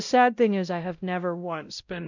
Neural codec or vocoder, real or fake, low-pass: codec, 16 kHz, 0.5 kbps, X-Codec, WavLM features, trained on Multilingual LibriSpeech; fake; 7.2 kHz